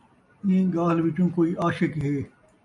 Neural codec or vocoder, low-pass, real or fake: none; 10.8 kHz; real